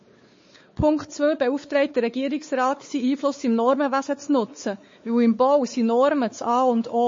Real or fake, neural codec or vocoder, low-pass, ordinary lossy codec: fake; codec, 16 kHz, 4 kbps, X-Codec, WavLM features, trained on Multilingual LibriSpeech; 7.2 kHz; MP3, 32 kbps